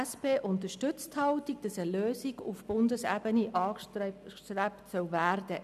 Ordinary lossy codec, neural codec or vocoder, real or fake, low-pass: none; none; real; 14.4 kHz